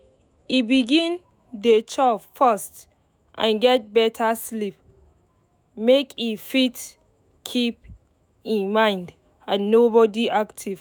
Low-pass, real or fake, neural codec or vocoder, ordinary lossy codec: none; fake; autoencoder, 48 kHz, 128 numbers a frame, DAC-VAE, trained on Japanese speech; none